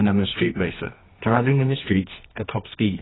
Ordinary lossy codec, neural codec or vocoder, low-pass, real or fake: AAC, 16 kbps; codec, 24 kHz, 0.9 kbps, WavTokenizer, medium music audio release; 7.2 kHz; fake